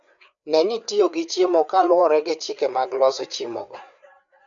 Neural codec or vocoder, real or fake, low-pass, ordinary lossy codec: codec, 16 kHz, 4 kbps, FreqCodec, larger model; fake; 7.2 kHz; none